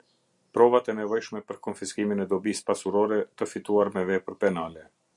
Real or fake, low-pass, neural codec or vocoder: real; 10.8 kHz; none